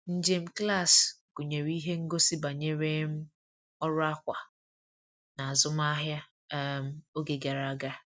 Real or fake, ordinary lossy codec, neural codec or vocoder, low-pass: real; none; none; none